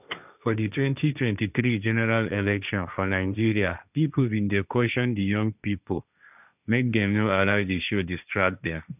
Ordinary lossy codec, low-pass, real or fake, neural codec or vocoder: none; 3.6 kHz; fake; codec, 16 kHz, 1.1 kbps, Voila-Tokenizer